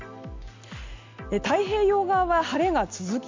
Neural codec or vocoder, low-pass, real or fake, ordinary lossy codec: none; 7.2 kHz; real; MP3, 64 kbps